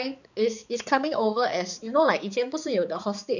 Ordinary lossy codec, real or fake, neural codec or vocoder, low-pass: none; fake; codec, 16 kHz, 4 kbps, X-Codec, HuBERT features, trained on balanced general audio; 7.2 kHz